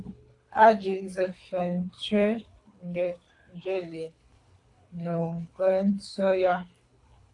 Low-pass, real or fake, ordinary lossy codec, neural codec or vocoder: 10.8 kHz; fake; AAC, 48 kbps; codec, 24 kHz, 3 kbps, HILCodec